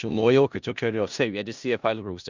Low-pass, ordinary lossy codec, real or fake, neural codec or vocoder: 7.2 kHz; Opus, 64 kbps; fake; codec, 16 kHz in and 24 kHz out, 0.4 kbps, LongCat-Audio-Codec, four codebook decoder